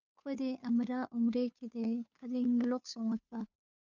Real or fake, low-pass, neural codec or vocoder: fake; 7.2 kHz; codec, 16 kHz, 2 kbps, FunCodec, trained on Chinese and English, 25 frames a second